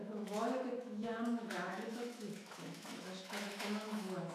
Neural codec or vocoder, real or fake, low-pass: autoencoder, 48 kHz, 128 numbers a frame, DAC-VAE, trained on Japanese speech; fake; 14.4 kHz